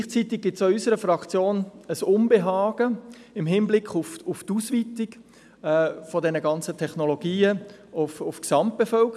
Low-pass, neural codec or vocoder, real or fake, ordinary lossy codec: none; none; real; none